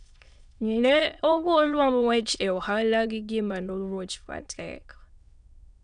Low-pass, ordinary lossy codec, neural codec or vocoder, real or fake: 9.9 kHz; none; autoencoder, 22.05 kHz, a latent of 192 numbers a frame, VITS, trained on many speakers; fake